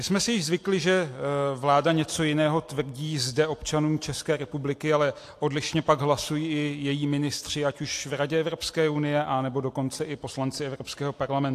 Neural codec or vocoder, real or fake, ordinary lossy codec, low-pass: none; real; AAC, 64 kbps; 14.4 kHz